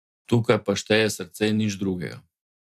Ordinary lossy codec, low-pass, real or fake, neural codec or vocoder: AAC, 96 kbps; 14.4 kHz; real; none